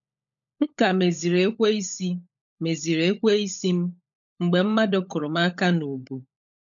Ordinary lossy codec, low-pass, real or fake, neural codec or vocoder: none; 7.2 kHz; fake; codec, 16 kHz, 16 kbps, FunCodec, trained on LibriTTS, 50 frames a second